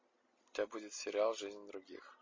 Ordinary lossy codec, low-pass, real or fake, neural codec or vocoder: MP3, 32 kbps; 7.2 kHz; real; none